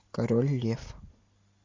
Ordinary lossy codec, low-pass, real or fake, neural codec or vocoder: MP3, 64 kbps; 7.2 kHz; real; none